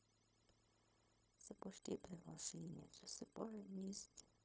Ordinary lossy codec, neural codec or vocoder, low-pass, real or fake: none; codec, 16 kHz, 0.4 kbps, LongCat-Audio-Codec; none; fake